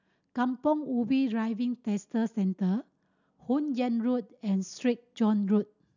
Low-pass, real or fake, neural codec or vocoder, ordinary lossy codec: 7.2 kHz; real; none; none